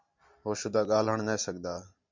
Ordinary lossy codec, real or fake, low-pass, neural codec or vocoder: MP3, 64 kbps; real; 7.2 kHz; none